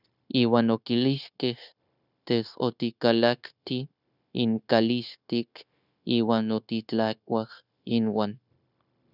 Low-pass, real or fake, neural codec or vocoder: 5.4 kHz; fake; codec, 16 kHz, 0.9 kbps, LongCat-Audio-Codec